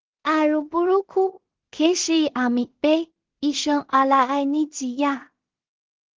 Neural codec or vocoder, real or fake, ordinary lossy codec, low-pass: codec, 16 kHz in and 24 kHz out, 0.4 kbps, LongCat-Audio-Codec, two codebook decoder; fake; Opus, 16 kbps; 7.2 kHz